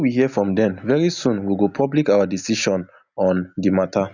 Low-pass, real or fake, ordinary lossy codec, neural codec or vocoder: 7.2 kHz; real; none; none